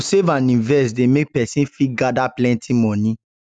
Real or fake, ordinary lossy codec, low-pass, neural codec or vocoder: real; none; 9.9 kHz; none